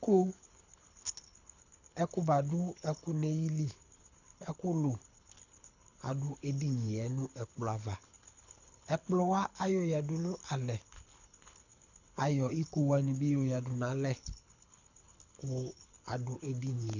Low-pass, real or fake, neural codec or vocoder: 7.2 kHz; fake; codec, 24 kHz, 6 kbps, HILCodec